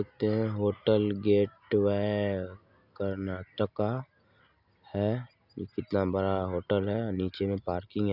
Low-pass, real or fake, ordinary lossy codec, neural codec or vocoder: 5.4 kHz; real; none; none